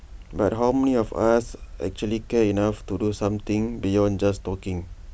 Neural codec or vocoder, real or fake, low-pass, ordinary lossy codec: none; real; none; none